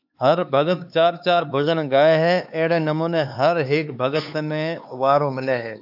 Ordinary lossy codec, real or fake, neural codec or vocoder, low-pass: AAC, 48 kbps; fake; codec, 16 kHz, 4 kbps, X-Codec, HuBERT features, trained on LibriSpeech; 5.4 kHz